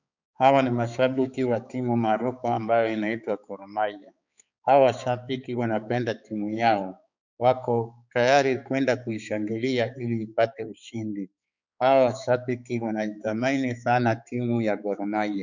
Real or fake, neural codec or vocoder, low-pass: fake; codec, 16 kHz, 4 kbps, X-Codec, HuBERT features, trained on balanced general audio; 7.2 kHz